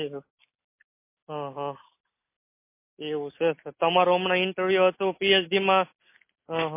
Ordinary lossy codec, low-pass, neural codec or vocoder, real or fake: MP3, 24 kbps; 3.6 kHz; none; real